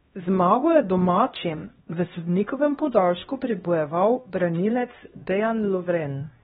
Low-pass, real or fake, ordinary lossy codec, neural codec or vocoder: 7.2 kHz; fake; AAC, 16 kbps; codec, 16 kHz, 1 kbps, X-Codec, HuBERT features, trained on LibriSpeech